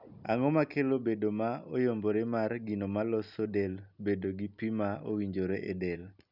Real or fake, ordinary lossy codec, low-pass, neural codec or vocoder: real; none; 5.4 kHz; none